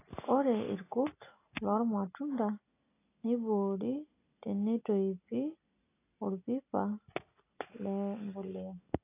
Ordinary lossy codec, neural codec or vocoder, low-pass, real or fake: AAC, 24 kbps; none; 3.6 kHz; real